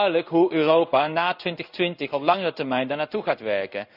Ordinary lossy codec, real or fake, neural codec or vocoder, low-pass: none; fake; codec, 16 kHz in and 24 kHz out, 1 kbps, XY-Tokenizer; 5.4 kHz